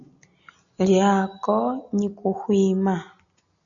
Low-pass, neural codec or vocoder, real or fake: 7.2 kHz; none; real